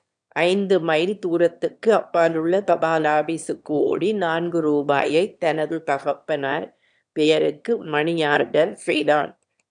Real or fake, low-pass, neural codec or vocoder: fake; 9.9 kHz; autoencoder, 22.05 kHz, a latent of 192 numbers a frame, VITS, trained on one speaker